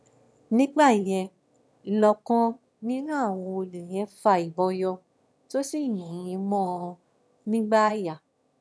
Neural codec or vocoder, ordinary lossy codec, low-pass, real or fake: autoencoder, 22.05 kHz, a latent of 192 numbers a frame, VITS, trained on one speaker; none; none; fake